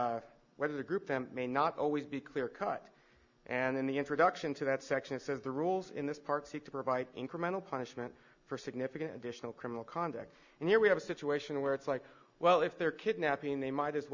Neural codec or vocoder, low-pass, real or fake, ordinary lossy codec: none; 7.2 kHz; real; Opus, 64 kbps